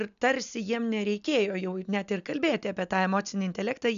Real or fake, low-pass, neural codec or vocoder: real; 7.2 kHz; none